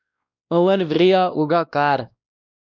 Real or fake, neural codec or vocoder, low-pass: fake; codec, 16 kHz, 1 kbps, X-Codec, WavLM features, trained on Multilingual LibriSpeech; 7.2 kHz